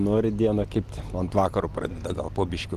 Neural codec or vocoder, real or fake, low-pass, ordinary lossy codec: none; real; 14.4 kHz; Opus, 24 kbps